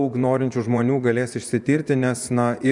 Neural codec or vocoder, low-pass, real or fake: vocoder, 24 kHz, 100 mel bands, Vocos; 10.8 kHz; fake